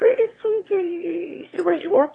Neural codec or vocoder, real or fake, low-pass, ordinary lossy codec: autoencoder, 22.05 kHz, a latent of 192 numbers a frame, VITS, trained on one speaker; fake; 9.9 kHz; AAC, 32 kbps